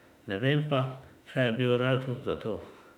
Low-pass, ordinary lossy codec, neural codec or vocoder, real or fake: 19.8 kHz; none; autoencoder, 48 kHz, 32 numbers a frame, DAC-VAE, trained on Japanese speech; fake